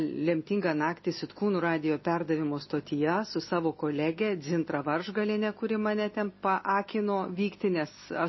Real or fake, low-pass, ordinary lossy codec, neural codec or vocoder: real; 7.2 kHz; MP3, 24 kbps; none